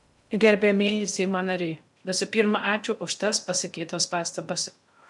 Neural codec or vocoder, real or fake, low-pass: codec, 16 kHz in and 24 kHz out, 0.6 kbps, FocalCodec, streaming, 2048 codes; fake; 10.8 kHz